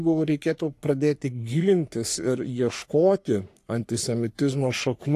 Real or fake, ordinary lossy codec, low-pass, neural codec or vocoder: fake; AAC, 64 kbps; 14.4 kHz; codec, 44.1 kHz, 3.4 kbps, Pupu-Codec